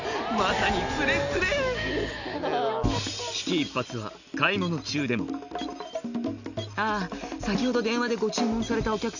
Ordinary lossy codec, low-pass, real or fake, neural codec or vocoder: none; 7.2 kHz; real; none